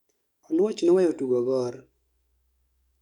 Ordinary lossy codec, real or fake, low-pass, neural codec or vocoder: none; fake; 19.8 kHz; codec, 44.1 kHz, 7.8 kbps, DAC